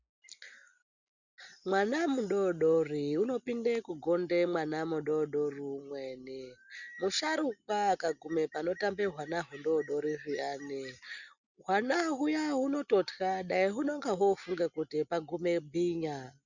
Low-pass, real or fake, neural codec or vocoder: 7.2 kHz; real; none